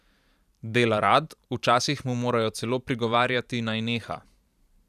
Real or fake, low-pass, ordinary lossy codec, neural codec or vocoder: fake; 14.4 kHz; none; vocoder, 44.1 kHz, 128 mel bands every 512 samples, BigVGAN v2